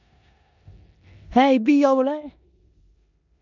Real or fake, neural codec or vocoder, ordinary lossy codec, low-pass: fake; codec, 16 kHz in and 24 kHz out, 0.9 kbps, LongCat-Audio-Codec, four codebook decoder; none; 7.2 kHz